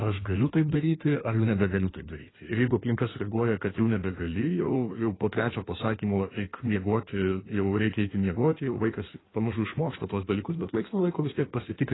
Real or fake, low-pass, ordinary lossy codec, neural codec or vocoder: fake; 7.2 kHz; AAC, 16 kbps; codec, 16 kHz in and 24 kHz out, 1.1 kbps, FireRedTTS-2 codec